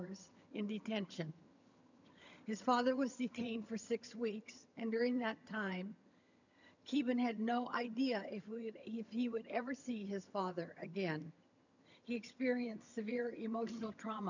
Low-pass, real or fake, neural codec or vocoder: 7.2 kHz; fake; vocoder, 22.05 kHz, 80 mel bands, HiFi-GAN